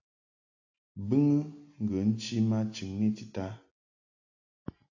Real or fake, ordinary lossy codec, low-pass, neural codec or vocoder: real; AAC, 48 kbps; 7.2 kHz; none